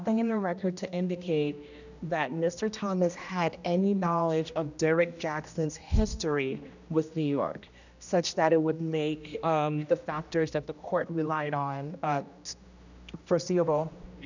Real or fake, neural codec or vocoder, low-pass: fake; codec, 16 kHz, 1 kbps, X-Codec, HuBERT features, trained on general audio; 7.2 kHz